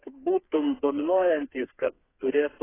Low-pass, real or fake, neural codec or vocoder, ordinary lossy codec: 3.6 kHz; fake; codec, 32 kHz, 1.9 kbps, SNAC; AAC, 16 kbps